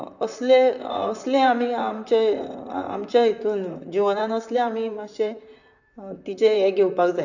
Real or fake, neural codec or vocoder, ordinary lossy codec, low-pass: fake; vocoder, 44.1 kHz, 128 mel bands, Pupu-Vocoder; none; 7.2 kHz